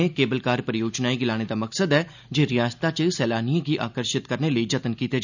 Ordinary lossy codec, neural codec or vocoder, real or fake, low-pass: none; none; real; 7.2 kHz